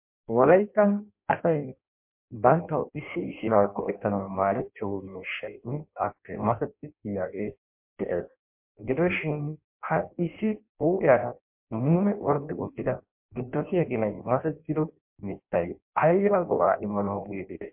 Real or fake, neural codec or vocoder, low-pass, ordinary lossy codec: fake; codec, 16 kHz in and 24 kHz out, 0.6 kbps, FireRedTTS-2 codec; 3.6 kHz; MP3, 32 kbps